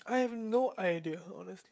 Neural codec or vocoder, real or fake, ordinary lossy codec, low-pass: codec, 16 kHz, 16 kbps, FreqCodec, smaller model; fake; none; none